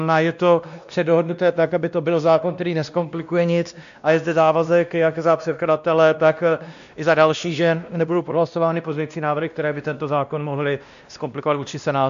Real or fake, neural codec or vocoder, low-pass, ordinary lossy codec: fake; codec, 16 kHz, 1 kbps, X-Codec, WavLM features, trained on Multilingual LibriSpeech; 7.2 kHz; AAC, 96 kbps